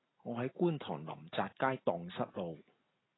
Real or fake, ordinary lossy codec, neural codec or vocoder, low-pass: real; AAC, 16 kbps; none; 7.2 kHz